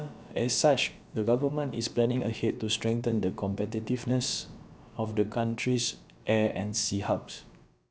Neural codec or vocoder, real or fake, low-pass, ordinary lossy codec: codec, 16 kHz, about 1 kbps, DyCAST, with the encoder's durations; fake; none; none